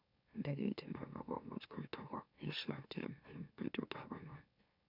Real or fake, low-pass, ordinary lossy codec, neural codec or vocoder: fake; 5.4 kHz; none; autoencoder, 44.1 kHz, a latent of 192 numbers a frame, MeloTTS